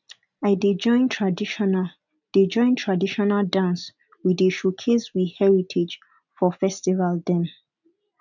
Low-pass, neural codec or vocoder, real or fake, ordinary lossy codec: 7.2 kHz; none; real; none